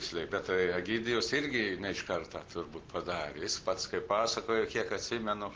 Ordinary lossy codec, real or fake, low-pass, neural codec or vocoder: Opus, 16 kbps; real; 7.2 kHz; none